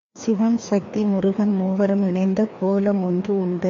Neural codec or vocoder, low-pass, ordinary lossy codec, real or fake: codec, 16 kHz, 2 kbps, FreqCodec, larger model; 7.2 kHz; AAC, 48 kbps; fake